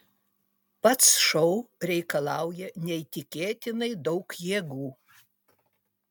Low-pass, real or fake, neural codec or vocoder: 19.8 kHz; real; none